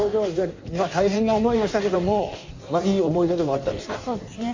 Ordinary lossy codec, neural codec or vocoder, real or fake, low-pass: MP3, 48 kbps; codec, 16 kHz in and 24 kHz out, 1.1 kbps, FireRedTTS-2 codec; fake; 7.2 kHz